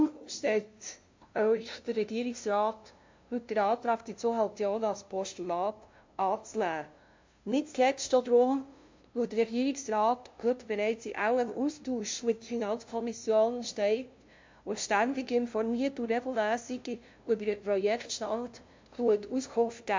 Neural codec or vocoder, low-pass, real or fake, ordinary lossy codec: codec, 16 kHz, 0.5 kbps, FunCodec, trained on LibriTTS, 25 frames a second; 7.2 kHz; fake; MP3, 48 kbps